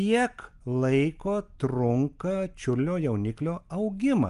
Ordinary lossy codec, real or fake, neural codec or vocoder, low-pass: Opus, 32 kbps; real; none; 10.8 kHz